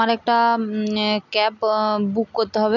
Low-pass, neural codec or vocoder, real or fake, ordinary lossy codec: 7.2 kHz; none; real; none